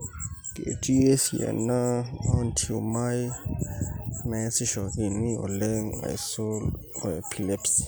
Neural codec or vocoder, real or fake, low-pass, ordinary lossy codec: none; real; none; none